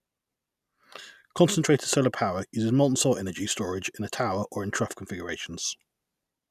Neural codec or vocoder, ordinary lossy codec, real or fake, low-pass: none; none; real; 14.4 kHz